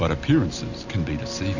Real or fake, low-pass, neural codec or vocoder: real; 7.2 kHz; none